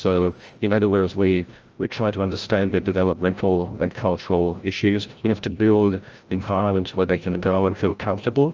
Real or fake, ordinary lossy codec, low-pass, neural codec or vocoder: fake; Opus, 24 kbps; 7.2 kHz; codec, 16 kHz, 0.5 kbps, FreqCodec, larger model